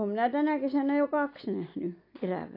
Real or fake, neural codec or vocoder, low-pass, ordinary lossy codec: real; none; 5.4 kHz; AAC, 32 kbps